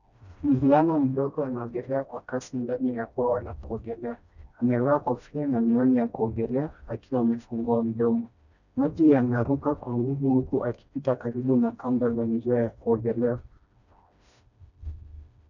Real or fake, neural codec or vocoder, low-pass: fake; codec, 16 kHz, 1 kbps, FreqCodec, smaller model; 7.2 kHz